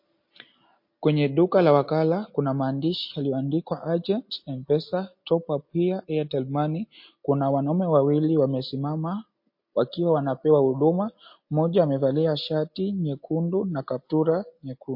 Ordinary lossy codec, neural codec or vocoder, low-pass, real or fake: MP3, 32 kbps; none; 5.4 kHz; real